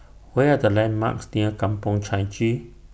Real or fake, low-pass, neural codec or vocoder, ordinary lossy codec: real; none; none; none